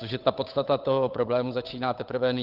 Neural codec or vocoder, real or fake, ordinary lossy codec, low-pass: codec, 16 kHz, 8 kbps, FunCodec, trained on Chinese and English, 25 frames a second; fake; Opus, 24 kbps; 5.4 kHz